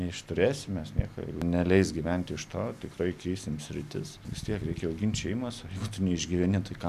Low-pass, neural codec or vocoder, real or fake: 14.4 kHz; none; real